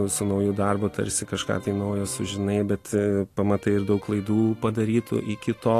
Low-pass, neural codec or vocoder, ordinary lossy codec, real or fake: 14.4 kHz; none; AAC, 48 kbps; real